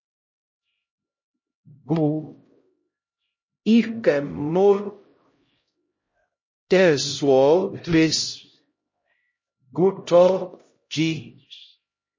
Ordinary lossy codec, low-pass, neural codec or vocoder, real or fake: MP3, 32 kbps; 7.2 kHz; codec, 16 kHz, 0.5 kbps, X-Codec, HuBERT features, trained on LibriSpeech; fake